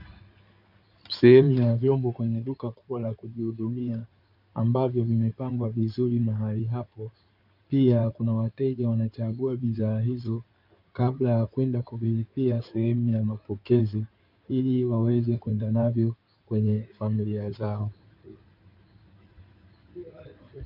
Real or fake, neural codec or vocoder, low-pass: fake; codec, 16 kHz in and 24 kHz out, 2.2 kbps, FireRedTTS-2 codec; 5.4 kHz